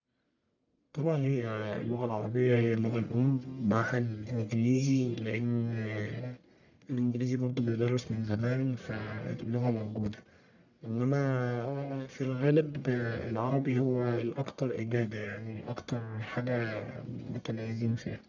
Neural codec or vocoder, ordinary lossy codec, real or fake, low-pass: codec, 44.1 kHz, 1.7 kbps, Pupu-Codec; none; fake; 7.2 kHz